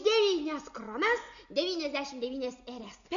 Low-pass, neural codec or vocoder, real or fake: 7.2 kHz; none; real